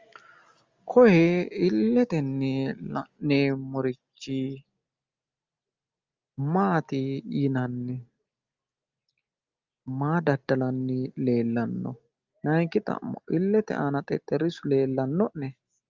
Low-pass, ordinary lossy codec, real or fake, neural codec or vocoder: 7.2 kHz; Opus, 32 kbps; real; none